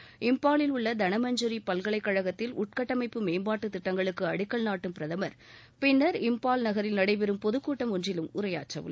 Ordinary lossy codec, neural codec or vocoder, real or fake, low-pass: none; none; real; none